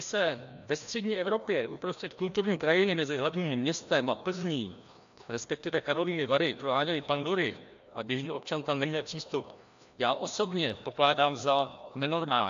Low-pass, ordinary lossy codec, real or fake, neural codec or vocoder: 7.2 kHz; AAC, 64 kbps; fake; codec, 16 kHz, 1 kbps, FreqCodec, larger model